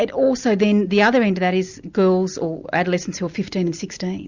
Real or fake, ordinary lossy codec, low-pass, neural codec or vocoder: real; Opus, 64 kbps; 7.2 kHz; none